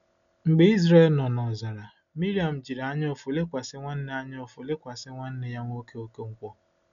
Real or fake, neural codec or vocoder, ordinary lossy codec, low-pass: real; none; none; 7.2 kHz